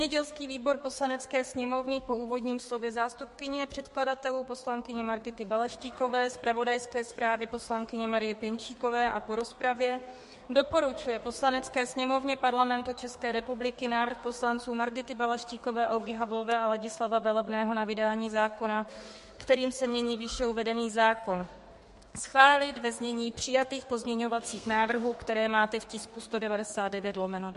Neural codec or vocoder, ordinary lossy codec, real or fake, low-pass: codec, 32 kHz, 1.9 kbps, SNAC; MP3, 48 kbps; fake; 14.4 kHz